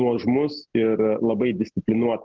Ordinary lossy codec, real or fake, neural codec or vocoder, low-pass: Opus, 16 kbps; real; none; 7.2 kHz